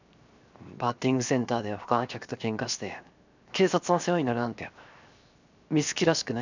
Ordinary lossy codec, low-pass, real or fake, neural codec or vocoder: none; 7.2 kHz; fake; codec, 16 kHz, 0.7 kbps, FocalCodec